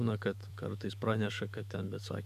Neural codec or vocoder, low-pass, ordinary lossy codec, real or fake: autoencoder, 48 kHz, 128 numbers a frame, DAC-VAE, trained on Japanese speech; 14.4 kHz; AAC, 96 kbps; fake